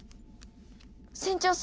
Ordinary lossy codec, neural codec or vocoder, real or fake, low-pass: none; none; real; none